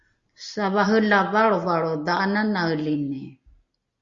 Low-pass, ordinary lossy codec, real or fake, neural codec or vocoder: 7.2 kHz; Opus, 64 kbps; real; none